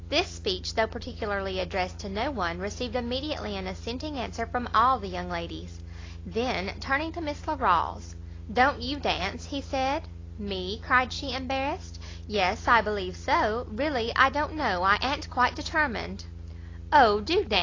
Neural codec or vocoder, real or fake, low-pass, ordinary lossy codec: none; real; 7.2 kHz; AAC, 32 kbps